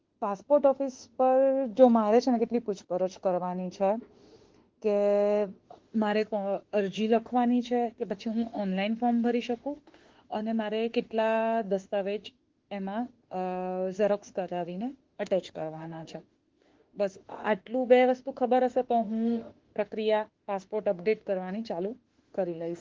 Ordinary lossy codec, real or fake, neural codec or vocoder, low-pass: Opus, 16 kbps; fake; autoencoder, 48 kHz, 32 numbers a frame, DAC-VAE, trained on Japanese speech; 7.2 kHz